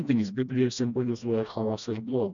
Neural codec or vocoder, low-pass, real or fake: codec, 16 kHz, 1 kbps, FreqCodec, smaller model; 7.2 kHz; fake